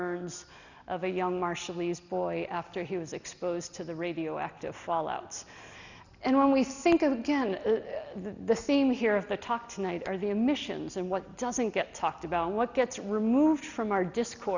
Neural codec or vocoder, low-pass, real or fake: none; 7.2 kHz; real